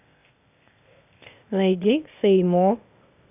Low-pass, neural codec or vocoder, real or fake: 3.6 kHz; codec, 16 kHz, 0.8 kbps, ZipCodec; fake